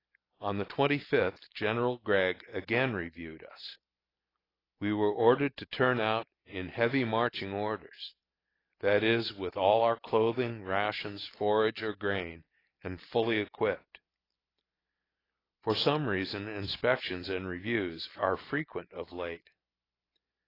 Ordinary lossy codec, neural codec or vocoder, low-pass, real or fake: AAC, 24 kbps; none; 5.4 kHz; real